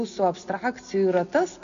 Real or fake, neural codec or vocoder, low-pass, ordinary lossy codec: real; none; 7.2 kHz; AAC, 96 kbps